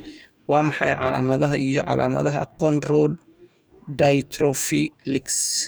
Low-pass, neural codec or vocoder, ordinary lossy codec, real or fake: none; codec, 44.1 kHz, 2.6 kbps, DAC; none; fake